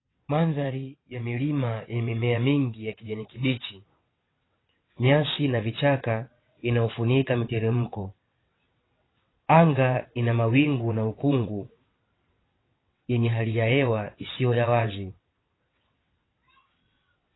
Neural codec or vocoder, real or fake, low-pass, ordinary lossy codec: vocoder, 22.05 kHz, 80 mel bands, WaveNeXt; fake; 7.2 kHz; AAC, 16 kbps